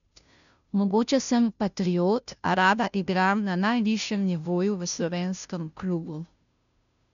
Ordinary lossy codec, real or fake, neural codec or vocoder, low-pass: none; fake; codec, 16 kHz, 0.5 kbps, FunCodec, trained on Chinese and English, 25 frames a second; 7.2 kHz